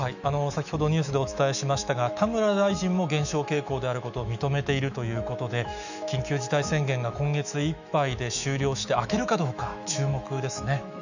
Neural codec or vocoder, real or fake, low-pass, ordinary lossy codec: autoencoder, 48 kHz, 128 numbers a frame, DAC-VAE, trained on Japanese speech; fake; 7.2 kHz; none